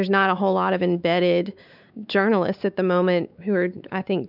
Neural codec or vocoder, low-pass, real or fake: codec, 16 kHz, 4 kbps, X-Codec, WavLM features, trained on Multilingual LibriSpeech; 5.4 kHz; fake